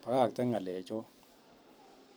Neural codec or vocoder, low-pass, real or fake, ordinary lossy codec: vocoder, 44.1 kHz, 128 mel bands every 256 samples, BigVGAN v2; none; fake; none